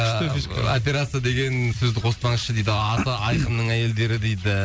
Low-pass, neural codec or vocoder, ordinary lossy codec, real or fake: none; none; none; real